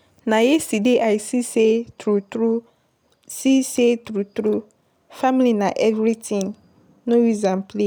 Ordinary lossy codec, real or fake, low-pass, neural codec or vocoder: none; real; none; none